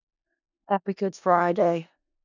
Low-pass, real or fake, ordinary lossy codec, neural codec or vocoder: 7.2 kHz; fake; none; codec, 16 kHz in and 24 kHz out, 0.4 kbps, LongCat-Audio-Codec, four codebook decoder